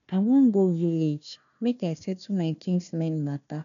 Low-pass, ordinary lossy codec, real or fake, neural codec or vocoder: 7.2 kHz; none; fake; codec, 16 kHz, 1 kbps, FunCodec, trained on Chinese and English, 50 frames a second